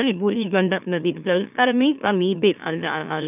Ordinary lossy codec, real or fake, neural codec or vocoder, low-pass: none; fake; autoencoder, 44.1 kHz, a latent of 192 numbers a frame, MeloTTS; 3.6 kHz